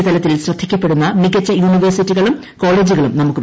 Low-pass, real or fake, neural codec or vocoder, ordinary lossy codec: none; real; none; none